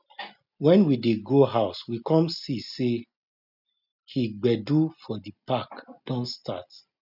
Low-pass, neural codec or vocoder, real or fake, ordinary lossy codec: 5.4 kHz; none; real; none